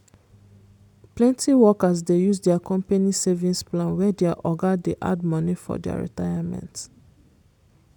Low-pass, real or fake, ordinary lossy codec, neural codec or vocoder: 19.8 kHz; real; none; none